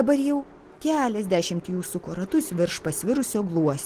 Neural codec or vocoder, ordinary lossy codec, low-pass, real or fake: none; Opus, 16 kbps; 14.4 kHz; real